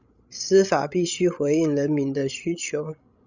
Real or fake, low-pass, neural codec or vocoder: fake; 7.2 kHz; codec, 16 kHz, 16 kbps, FreqCodec, larger model